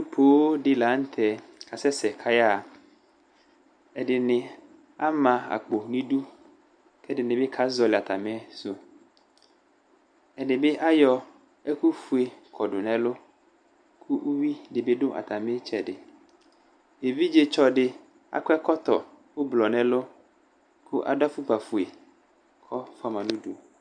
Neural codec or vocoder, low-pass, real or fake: none; 9.9 kHz; real